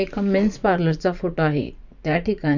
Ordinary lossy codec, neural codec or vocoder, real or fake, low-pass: none; vocoder, 44.1 kHz, 80 mel bands, Vocos; fake; 7.2 kHz